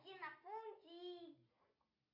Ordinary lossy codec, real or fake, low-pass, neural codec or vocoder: AAC, 48 kbps; real; 5.4 kHz; none